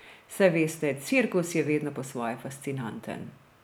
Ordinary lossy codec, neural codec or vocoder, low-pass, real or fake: none; none; none; real